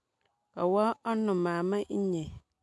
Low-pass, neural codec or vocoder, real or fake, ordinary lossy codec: none; none; real; none